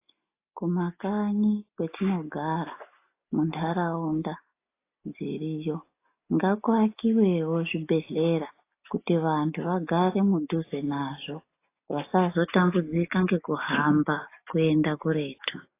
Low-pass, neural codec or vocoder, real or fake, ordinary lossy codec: 3.6 kHz; none; real; AAC, 24 kbps